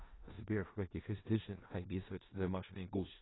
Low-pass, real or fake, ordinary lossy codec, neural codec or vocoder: 7.2 kHz; fake; AAC, 16 kbps; codec, 16 kHz in and 24 kHz out, 0.4 kbps, LongCat-Audio-Codec, four codebook decoder